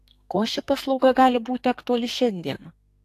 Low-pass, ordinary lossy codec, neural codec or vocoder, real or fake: 14.4 kHz; AAC, 64 kbps; codec, 44.1 kHz, 2.6 kbps, SNAC; fake